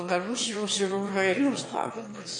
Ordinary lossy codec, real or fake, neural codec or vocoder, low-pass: MP3, 48 kbps; fake; autoencoder, 22.05 kHz, a latent of 192 numbers a frame, VITS, trained on one speaker; 9.9 kHz